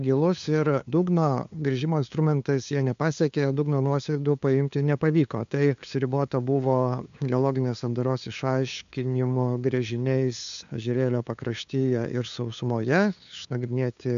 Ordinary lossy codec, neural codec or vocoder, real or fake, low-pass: MP3, 64 kbps; codec, 16 kHz, 2 kbps, FunCodec, trained on LibriTTS, 25 frames a second; fake; 7.2 kHz